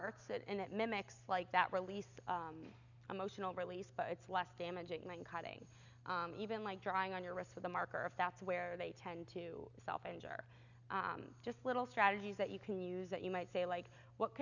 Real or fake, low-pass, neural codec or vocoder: real; 7.2 kHz; none